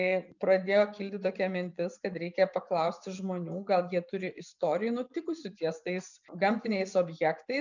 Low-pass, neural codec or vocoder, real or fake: 7.2 kHz; vocoder, 24 kHz, 100 mel bands, Vocos; fake